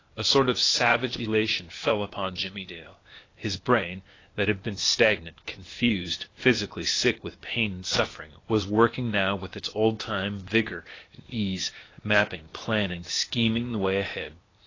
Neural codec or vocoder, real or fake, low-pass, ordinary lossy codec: codec, 16 kHz, 0.8 kbps, ZipCodec; fake; 7.2 kHz; AAC, 32 kbps